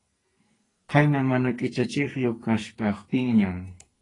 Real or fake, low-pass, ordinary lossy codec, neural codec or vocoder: fake; 10.8 kHz; AAC, 32 kbps; codec, 44.1 kHz, 2.6 kbps, SNAC